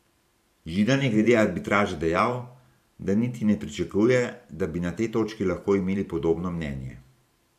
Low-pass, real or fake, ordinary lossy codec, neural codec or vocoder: 14.4 kHz; fake; none; vocoder, 44.1 kHz, 128 mel bands every 512 samples, BigVGAN v2